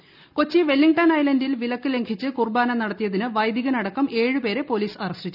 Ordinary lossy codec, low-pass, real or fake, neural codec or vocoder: none; 5.4 kHz; real; none